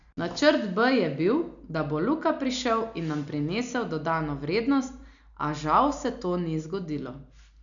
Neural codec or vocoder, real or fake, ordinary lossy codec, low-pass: none; real; none; 7.2 kHz